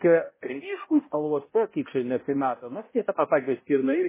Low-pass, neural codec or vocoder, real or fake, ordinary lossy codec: 3.6 kHz; codec, 16 kHz, 0.5 kbps, X-Codec, HuBERT features, trained on balanced general audio; fake; MP3, 16 kbps